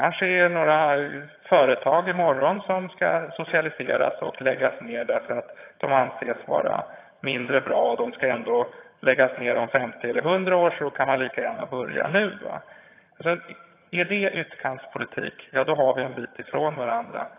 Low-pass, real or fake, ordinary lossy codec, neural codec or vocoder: 3.6 kHz; fake; AAC, 24 kbps; vocoder, 22.05 kHz, 80 mel bands, HiFi-GAN